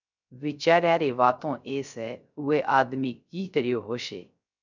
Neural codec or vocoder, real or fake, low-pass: codec, 16 kHz, 0.3 kbps, FocalCodec; fake; 7.2 kHz